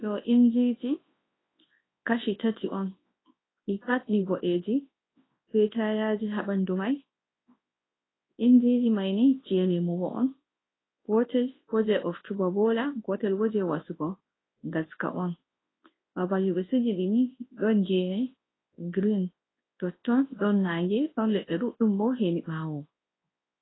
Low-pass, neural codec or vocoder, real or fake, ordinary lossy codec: 7.2 kHz; codec, 24 kHz, 0.9 kbps, WavTokenizer, large speech release; fake; AAC, 16 kbps